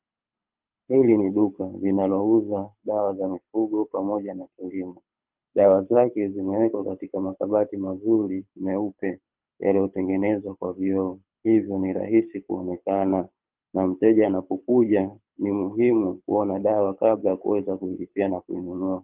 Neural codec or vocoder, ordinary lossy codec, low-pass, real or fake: codec, 24 kHz, 6 kbps, HILCodec; Opus, 24 kbps; 3.6 kHz; fake